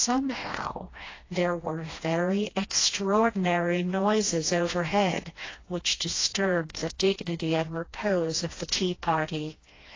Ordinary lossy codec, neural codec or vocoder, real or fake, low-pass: AAC, 32 kbps; codec, 16 kHz, 1 kbps, FreqCodec, smaller model; fake; 7.2 kHz